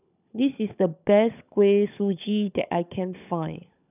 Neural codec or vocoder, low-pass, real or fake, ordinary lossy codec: codec, 16 kHz, 16 kbps, FunCodec, trained on LibriTTS, 50 frames a second; 3.6 kHz; fake; none